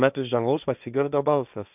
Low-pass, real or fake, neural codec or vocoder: 3.6 kHz; fake; codec, 16 kHz, 1.1 kbps, Voila-Tokenizer